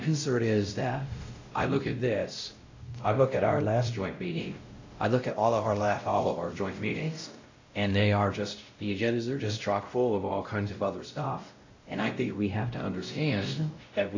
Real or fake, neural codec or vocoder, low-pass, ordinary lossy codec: fake; codec, 16 kHz, 0.5 kbps, X-Codec, WavLM features, trained on Multilingual LibriSpeech; 7.2 kHz; AAC, 48 kbps